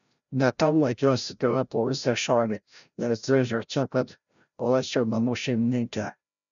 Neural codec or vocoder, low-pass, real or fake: codec, 16 kHz, 0.5 kbps, FreqCodec, larger model; 7.2 kHz; fake